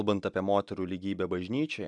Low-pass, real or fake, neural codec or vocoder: 10.8 kHz; real; none